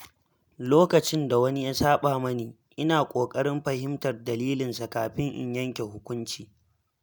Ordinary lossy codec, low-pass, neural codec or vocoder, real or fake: none; none; none; real